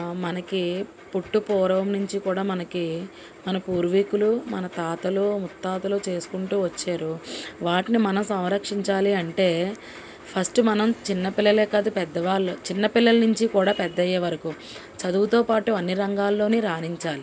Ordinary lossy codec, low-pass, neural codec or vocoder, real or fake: none; none; none; real